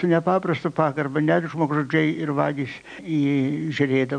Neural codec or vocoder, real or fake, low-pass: none; real; 9.9 kHz